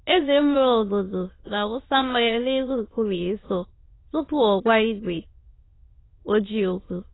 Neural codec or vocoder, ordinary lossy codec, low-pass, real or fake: autoencoder, 22.05 kHz, a latent of 192 numbers a frame, VITS, trained on many speakers; AAC, 16 kbps; 7.2 kHz; fake